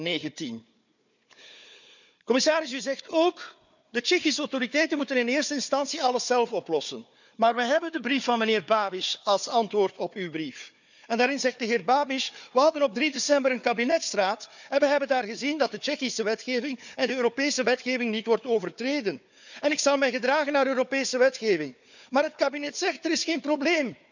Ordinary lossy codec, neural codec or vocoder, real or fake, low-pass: none; codec, 16 kHz, 4 kbps, FunCodec, trained on Chinese and English, 50 frames a second; fake; 7.2 kHz